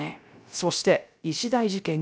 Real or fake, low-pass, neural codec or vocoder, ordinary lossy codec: fake; none; codec, 16 kHz, 0.3 kbps, FocalCodec; none